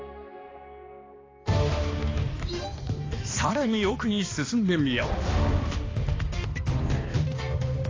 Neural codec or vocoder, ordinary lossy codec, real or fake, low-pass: codec, 16 kHz, 2 kbps, X-Codec, HuBERT features, trained on balanced general audio; AAC, 32 kbps; fake; 7.2 kHz